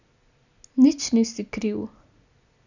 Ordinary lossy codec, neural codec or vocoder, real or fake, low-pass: none; none; real; 7.2 kHz